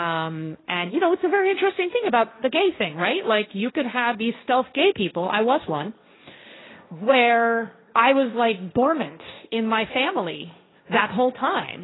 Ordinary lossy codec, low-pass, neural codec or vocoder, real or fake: AAC, 16 kbps; 7.2 kHz; codec, 16 kHz, 1.1 kbps, Voila-Tokenizer; fake